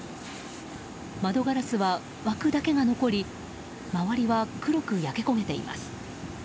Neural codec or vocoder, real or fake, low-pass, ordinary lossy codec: none; real; none; none